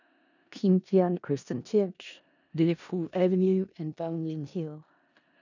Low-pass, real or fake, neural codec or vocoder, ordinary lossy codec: 7.2 kHz; fake; codec, 16 kHz in and 24 kHz out, 0.4 kbps, LongCat-Audio-Codec, four codebook decoder; none